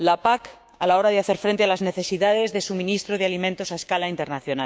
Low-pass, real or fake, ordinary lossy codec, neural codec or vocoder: none; fake; none; codec, 16 kHz, 6 kbps, DAC